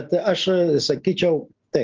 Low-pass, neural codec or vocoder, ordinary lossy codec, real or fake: 7.2 kHz; none; Opus, 32 kbps; real